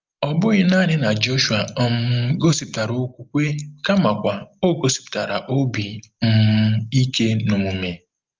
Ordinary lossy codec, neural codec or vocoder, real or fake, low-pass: Opus, 32 kbps; none; real; 7.2 kHz